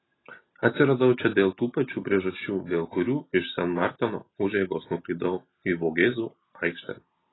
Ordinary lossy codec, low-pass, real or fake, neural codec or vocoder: AAC, 16 kbps; 7.2 kHz; real; none